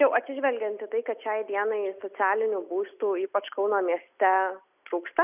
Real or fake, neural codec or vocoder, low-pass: real; none; 3.6 kHz